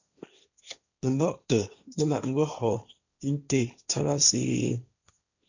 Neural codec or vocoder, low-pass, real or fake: codec, 16 kHz, 1.1 kbps, Voila-Tokenizer; 7.2 kHz; fake